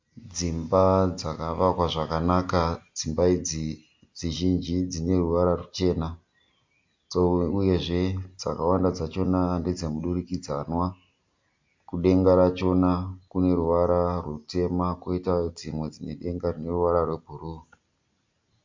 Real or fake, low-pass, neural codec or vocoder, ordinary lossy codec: real; 7.2 kHz; none; MP3, 48 kbps